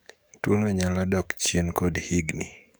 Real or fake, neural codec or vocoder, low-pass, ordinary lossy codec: fake; codec, 44.1 kHz, 7.8 kbps, DAC; none; none